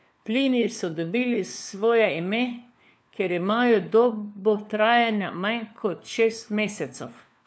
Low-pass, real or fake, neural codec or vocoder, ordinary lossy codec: none; fake; codec, 16 kHz, 4 kbps, FunCodec, trained on LibriTTS, 50 frames a second; none